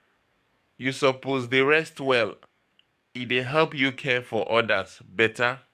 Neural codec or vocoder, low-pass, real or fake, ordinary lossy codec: codec, 44.1 kHz, 7.8 kbps, DAC; 14.4 kHz; fake; none